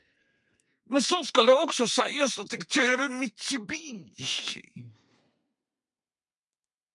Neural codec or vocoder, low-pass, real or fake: codec, 32 kHz, 1.9 kbps, SNAC; 10.8 kHz; fake